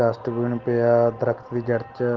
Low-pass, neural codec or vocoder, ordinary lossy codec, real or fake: 7.2 kHz; none; Opus, 16 kbps; real